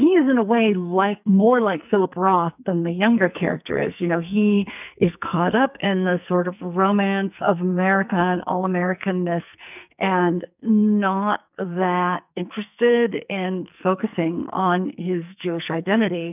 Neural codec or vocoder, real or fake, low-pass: codec, 44.1 kHz, 2.6 kbps, SNAC; fake; 3.6 kHz